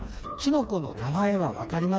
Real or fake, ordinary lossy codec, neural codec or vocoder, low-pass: fake; none; codec, 16 kHz, 2 kbps, FreqCodec, smaller model; none